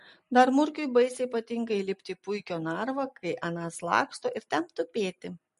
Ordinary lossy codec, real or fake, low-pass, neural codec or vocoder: MP3, 48 kbps; real; 14.4 kHz; none